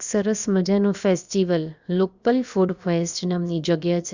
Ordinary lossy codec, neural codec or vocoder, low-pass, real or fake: Opus, 64 kbps; codec, 16 kHz, about 1 kbps, DyCAST, with the encoder's durations; 7.2 kHz; fake